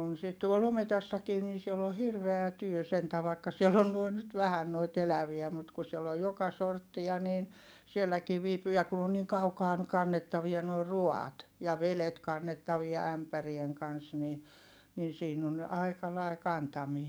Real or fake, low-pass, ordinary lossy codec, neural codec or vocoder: fake; none; none; codec, 44.1 kHz, 7.8 kbps, DAC